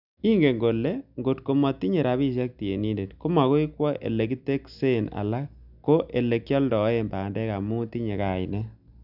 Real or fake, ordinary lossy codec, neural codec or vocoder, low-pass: real; none; none; 5.4 kHz